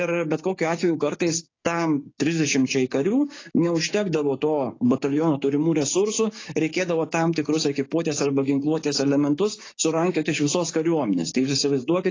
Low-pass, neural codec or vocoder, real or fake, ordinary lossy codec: 7.2 kHz; codec, 16 kHz, 6 kbps, DAC; fake; AAC, 32 kbps